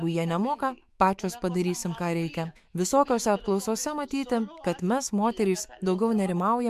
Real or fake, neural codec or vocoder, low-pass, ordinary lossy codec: fake; autoencoder, 48 kHz, 128 numbers a frame, DAC-VAE, trained on Japanese speech; 14.4 kHz; MP3, 96 kbps